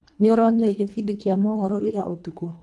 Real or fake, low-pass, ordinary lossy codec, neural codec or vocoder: fake; none; none; codec, 24 kHz, 1.5 kbps, HILCodec